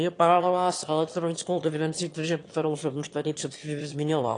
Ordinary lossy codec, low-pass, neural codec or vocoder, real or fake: AAC, 48 kbps; 9.9 kHz; autoencoder, 22.05 kHz, a latent of 192 numbers a frame, VITS, trained on one speaker; fake